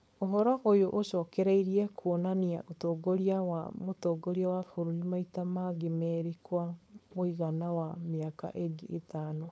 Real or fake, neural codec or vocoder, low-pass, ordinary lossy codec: fake; codec, 16 kHz, 4.8 kbps, FACodec; none; none